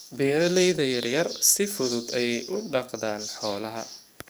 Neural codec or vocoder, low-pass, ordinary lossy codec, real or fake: codec, 44.1 kHz, 7.8 kbps, DAC; none; none; fake